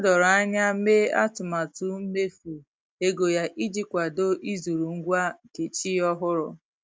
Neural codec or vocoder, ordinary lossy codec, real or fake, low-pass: none; none; real; none